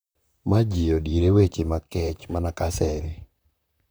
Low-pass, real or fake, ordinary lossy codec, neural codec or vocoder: none; fake; none; vocoder, 44.1 kHz, 128 mel bands, Pupu-Vocoder